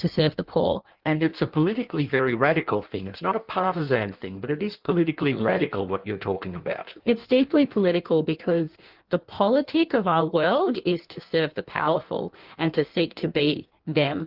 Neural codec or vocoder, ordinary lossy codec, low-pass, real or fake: codec, 16 kHz in and 24 kHz out, 1.1 kbps, FireRedTTS-2 codec; Opus, 32 kbps; 5.4 kHz; fake